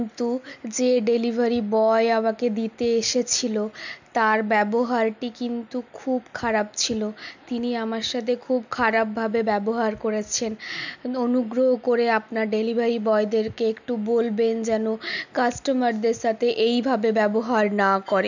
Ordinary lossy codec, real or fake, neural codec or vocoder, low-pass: none; real; none; 7.2 kHz